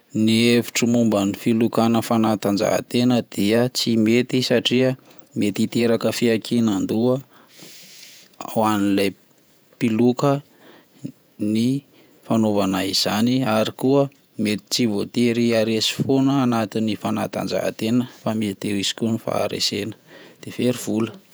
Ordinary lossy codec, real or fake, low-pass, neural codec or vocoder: none; fake; none; vocoder, 48 kHz, 128 mel bands, Vocos